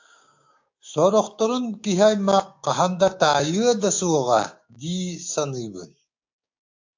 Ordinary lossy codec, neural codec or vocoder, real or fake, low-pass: AAC, 48 kbps; codec, 16 kHz, 6 kbps, DAC; fake; 7.2 kHz